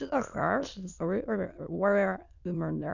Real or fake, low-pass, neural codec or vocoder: fake; 7.2 kHz; autoencoder, 22.05 kHz, a latent of 192 numbers a frame, VITS, trained on many speakers